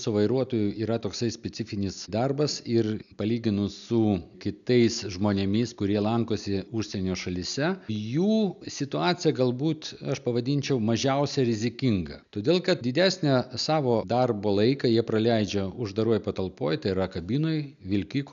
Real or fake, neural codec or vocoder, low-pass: real; none; 7.2 kHz